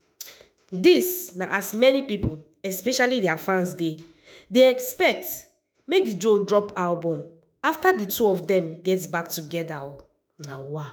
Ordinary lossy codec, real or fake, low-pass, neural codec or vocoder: none; fake; none; autoencoder, 48 kHz, 32 numbers a frame, DAC-VAE, trained on Japanese speech